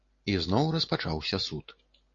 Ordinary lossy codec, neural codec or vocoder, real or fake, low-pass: AAC, 32 kbps; none; real; 7.2 kHz